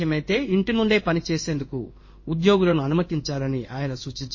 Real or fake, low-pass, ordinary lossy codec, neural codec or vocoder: fake; 7.2 kHz; MP3, 32 kbps; codec, 16 kHz in and 24 kHz out, 1 kbps, XY-Tokenizer